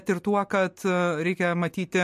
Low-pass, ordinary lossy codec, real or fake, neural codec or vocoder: 14.4 kHz; MP3, 64 kbps; real; none